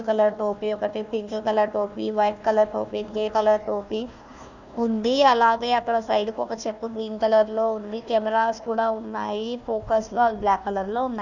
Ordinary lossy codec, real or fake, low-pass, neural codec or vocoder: none; fake; 7.2 kHz; codec, 16 kHz, 1 kbps, FunCodec, trained on Chinese and English, 50 frames a second